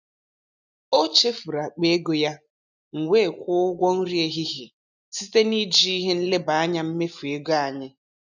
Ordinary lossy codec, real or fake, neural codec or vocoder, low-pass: none; real; none; 7.2 kHz